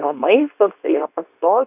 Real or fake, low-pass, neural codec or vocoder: fake; 3.6 kHz; codec, 24 kHz, 0.9 kbps, WavTokenizer, medium speech release version 2